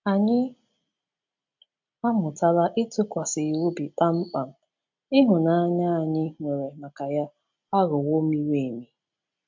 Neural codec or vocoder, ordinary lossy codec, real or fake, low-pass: none; MP3, 64 kbps; real; 7.2 kHz